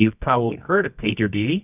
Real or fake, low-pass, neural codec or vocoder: fake; 3.6 kHz; codec, 24 kHz, 0.9 kbps, WavTokenizer, medium music audio release